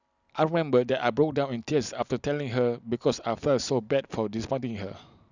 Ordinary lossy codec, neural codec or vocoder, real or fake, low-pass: none; none; real; 7.2 kHz